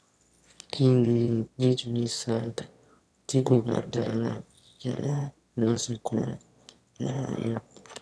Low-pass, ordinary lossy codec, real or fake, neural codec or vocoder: none; none; fake; autoencoder, 22.05 kHz, a latent of 192 numbers a frame, VITS, trained on one speaker